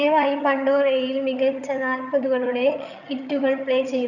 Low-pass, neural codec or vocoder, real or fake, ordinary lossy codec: 7.2 kHz; vocoder, 22.05 kHz, 80 mel bands, HiFi-GAN; fake; none